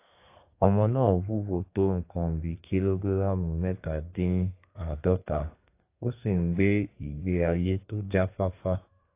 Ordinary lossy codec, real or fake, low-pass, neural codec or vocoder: AAC, 24 kbps; fake; 3.6 kHz; codec, 32 kHz, 1.9 kbps, SNAC